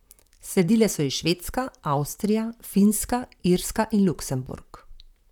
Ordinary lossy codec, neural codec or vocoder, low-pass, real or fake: none; vocoder, 44.1 kHz, 128 mel bands, Pupu-Vocoder; 19.8 kHz; fake